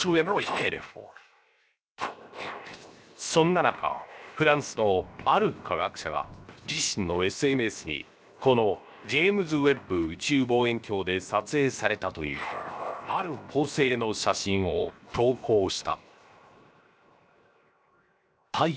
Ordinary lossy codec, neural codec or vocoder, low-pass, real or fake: none; codec, 16 kHz, 0.7 kbps, FocalCodec; none; fake